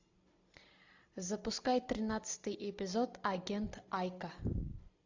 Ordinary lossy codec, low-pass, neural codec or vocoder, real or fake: AAC, 48 kbps; 7.2 kHz; none; real